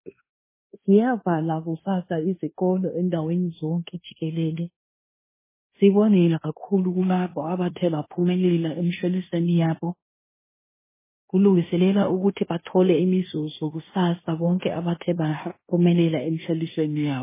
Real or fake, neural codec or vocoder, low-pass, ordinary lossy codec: fake; codec, 16 kHz in and 24 kHz out, 0.9 kbps, LongCat-Audio-Codec, fine tuned four codebook decoder; 3.6 kHz; MP3, 16 kbps